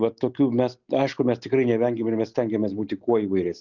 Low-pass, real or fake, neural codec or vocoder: 7.2 kHz; real; none